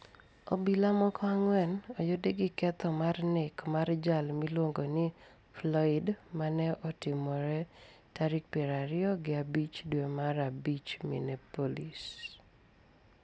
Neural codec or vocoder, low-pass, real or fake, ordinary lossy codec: none; none; real; none